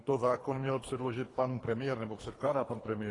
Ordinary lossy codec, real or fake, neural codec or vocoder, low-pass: AAC, 32 kbps; fake; codec, 24 kHz, 3 kbps, HILCodec; 10.8 kHz